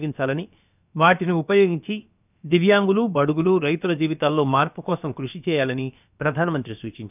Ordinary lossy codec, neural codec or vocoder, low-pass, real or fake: none; codec, 16 kHz, about 1 kbps, DyCAST, with the encoder's durations; 3.6 kHz; fake